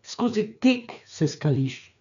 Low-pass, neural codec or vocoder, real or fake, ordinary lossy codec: 7.2 kHz; codec, 16 kHz, 2 kbps, FreqCodec, larger model; fake; none